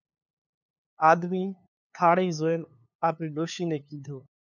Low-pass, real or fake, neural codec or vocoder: 7.2 kHz; fake; codec, 16 kHz, 2 kbps, FunCodec, trained on LibriTTS, 25 frames a second